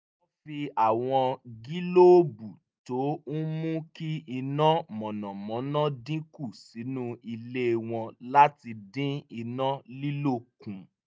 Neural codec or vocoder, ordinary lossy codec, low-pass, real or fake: none; none; none; real